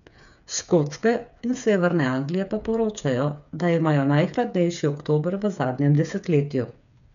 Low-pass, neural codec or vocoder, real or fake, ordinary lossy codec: 7.2 kHz; codec, 16 kHz, 8 kbps, FreqCodec, smaller model; fake; none